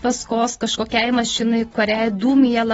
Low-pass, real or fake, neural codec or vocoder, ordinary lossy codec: 19.8 kHz; real; none; AAC, 24 kbps